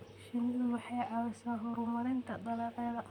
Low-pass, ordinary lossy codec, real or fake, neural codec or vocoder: 19.8 kHz; none; fake; vocoder, 44.1 kHz, 128 mel bands, Pupu-Vocoder